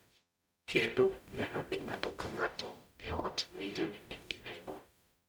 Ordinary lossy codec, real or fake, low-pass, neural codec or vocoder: none; fake; none; codec, 44.1 kHz, 0.9 kbps, DAC